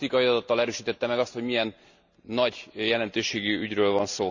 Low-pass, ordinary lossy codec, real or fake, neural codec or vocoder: 7.2 kHz; none; real; none